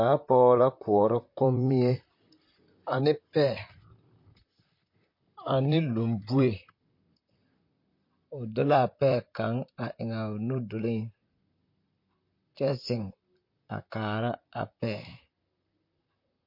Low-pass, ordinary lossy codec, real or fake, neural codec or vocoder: 5.4 kHz; MP3, 32 kbps; fake; vocoder, 44.1 kHz, 128 mel bands, Pupu-Vocoder